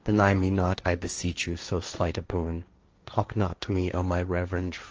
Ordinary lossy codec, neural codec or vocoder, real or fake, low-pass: Opus, 24 kbps; codec, 16 kHz, 1.1 kbps, Voila-Tokenizer; fake; 7.2 kHz